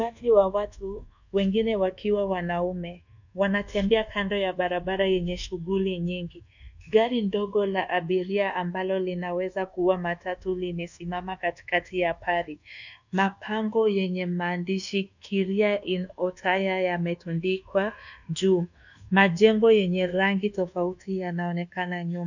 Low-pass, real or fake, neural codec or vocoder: 7.2 kHz; fake; codec, 24 kHz, 1.2 kbps, DualCodec